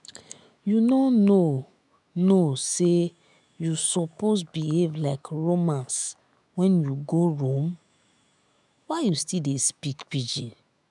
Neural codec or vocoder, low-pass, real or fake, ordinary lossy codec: autoencoder, 48 kHz, 128 numbers a frame, DAC-VAE, trained on Japanese speech; 10.8 kHz; fake; none